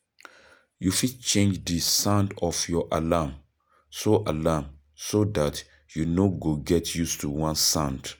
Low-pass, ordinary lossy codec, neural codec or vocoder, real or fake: none; none; none; real